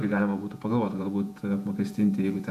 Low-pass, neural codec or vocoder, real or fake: 14.4 kHz; vocoder, 48 kHz, 128 mel bands, Vocos; fake